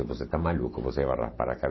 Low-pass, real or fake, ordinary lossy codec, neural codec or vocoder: 7.2 kHz; real; MP3, 24 kbps; none